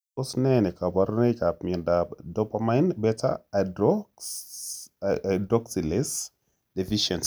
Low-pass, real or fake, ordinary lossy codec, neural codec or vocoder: none; real; none; none